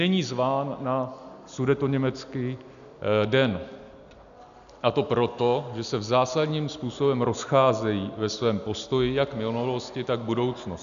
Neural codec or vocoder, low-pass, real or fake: none; 7.2 kHz; real